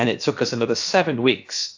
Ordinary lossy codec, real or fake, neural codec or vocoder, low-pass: AAC, 48 kbps; fake; codec, 16 kHz, about 1 kbps, DyCAST, with the encoder's durations; 7.2 kHz